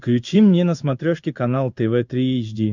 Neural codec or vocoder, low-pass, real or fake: codec, 16 kHz in and 24 kHz out, 1 kbps, XY-Tokenizer; 7.2 kHz; fake